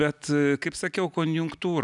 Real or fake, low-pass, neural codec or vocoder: real; 10.8 kHz; none